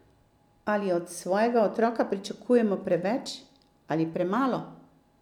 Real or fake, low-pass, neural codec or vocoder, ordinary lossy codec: real; 19.8 kHz; none; none